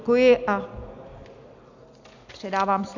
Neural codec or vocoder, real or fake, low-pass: none; real; 7.2 kHz